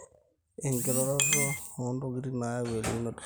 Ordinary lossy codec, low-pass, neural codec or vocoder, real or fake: none; none; vocoder, 44.1 kHz, 128 mel bands every 256 samples, BigVGAN v2; fake